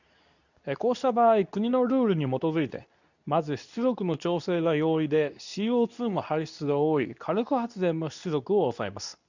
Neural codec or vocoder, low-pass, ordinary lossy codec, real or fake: codec, 24 kHz, 0.9 kbps, WavTokenizer, medium speech release version 2; 7.2 kHz; none; fake